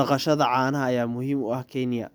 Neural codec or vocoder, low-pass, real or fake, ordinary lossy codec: none; none; real; none